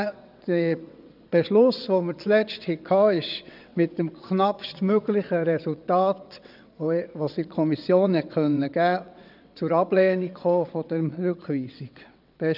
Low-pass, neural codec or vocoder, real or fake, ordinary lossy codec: 5.4 kHz; vocoder, 22.05 kHz, 80 mel bands, WaveNeXt; fake; none